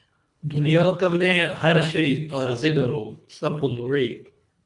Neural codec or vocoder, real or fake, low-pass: codec, 24 kHz, 1.5 kbps, HILCodec; fake; 10.8 kHz